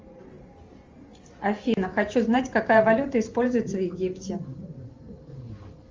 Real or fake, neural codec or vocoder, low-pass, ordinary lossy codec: fake; vocoder, 44.1 kHz, 128 mel bands every 512 samples, BigVGAN v2; 7.2 kHz; Opus, 32 kbps